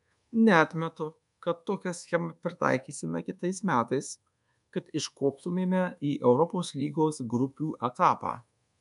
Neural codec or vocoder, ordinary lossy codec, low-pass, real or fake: codec, 24 kHz, 1.2 kbps, DualCodec; MP3, 96 kbps; 10.8 kHz; fake